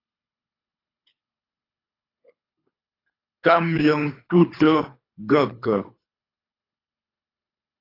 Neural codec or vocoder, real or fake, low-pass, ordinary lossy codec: codec, 24 kHz, 3 kbps, HILCodec; fake; 5.4 kHz; AAC, 32 kbps